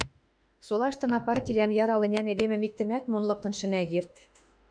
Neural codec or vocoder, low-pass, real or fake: autoencoder, 48 kHz, 32 numbers a frame, DAC-VAE, trained on Japanese speech; 9.9 kHz; fake